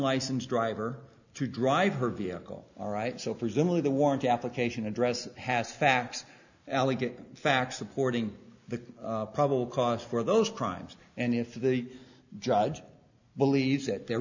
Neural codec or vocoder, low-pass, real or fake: none; 7.2 kHz; real